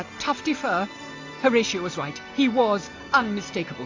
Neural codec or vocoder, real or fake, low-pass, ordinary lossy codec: none; real; 7.2 kHz; AAC, 48 kbps